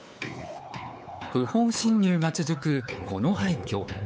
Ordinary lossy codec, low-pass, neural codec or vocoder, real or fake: none; none; codec, 16 kHz, 4 kbps, X-Codec, WavLM features, trained on Multilingual LibriSpeech; fake